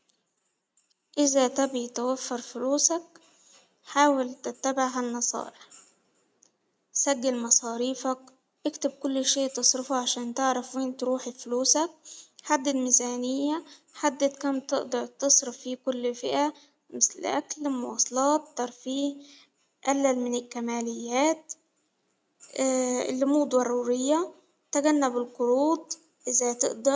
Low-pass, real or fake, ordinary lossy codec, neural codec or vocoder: none; real; none; none